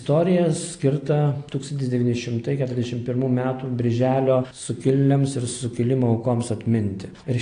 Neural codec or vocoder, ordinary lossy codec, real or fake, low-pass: none; AAC, 48 kbps; real; 9.9 kHz